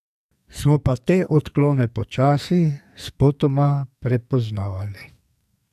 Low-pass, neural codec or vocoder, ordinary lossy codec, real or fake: 14.4 kHz; codec, 44.1 kHz, 2.6 kbps, SNAC; AAC, 96 kbps; fake